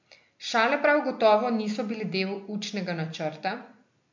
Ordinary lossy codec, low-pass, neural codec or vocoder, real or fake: MP3, 48 kbps; 7.2 kHz; none; real